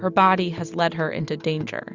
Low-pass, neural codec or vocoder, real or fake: 7.2 kHz; none; real